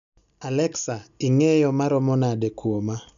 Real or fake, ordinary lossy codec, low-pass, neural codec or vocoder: real; none; 7.2 kHz; none